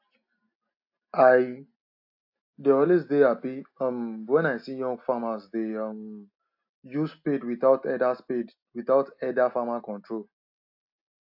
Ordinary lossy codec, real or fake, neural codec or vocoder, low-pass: AAC, 48 kbps; real; none; 5.4 kHz